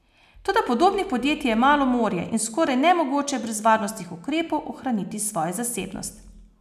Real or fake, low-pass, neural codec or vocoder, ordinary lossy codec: real; 14.4 kHz; none; none